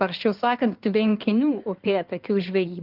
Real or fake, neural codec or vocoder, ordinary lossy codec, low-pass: fake; codec, 16 kHz in and 24 kHz out, 2.2 kbps, FireRedTTS-2 codec; Opus, 24 kbps; 5.4 kHz